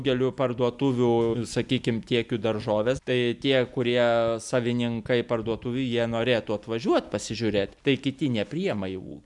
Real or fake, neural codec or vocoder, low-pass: real; none; 10.8 kHz